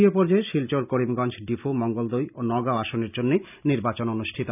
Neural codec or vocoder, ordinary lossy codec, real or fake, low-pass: none; none; real; 3.6 kHz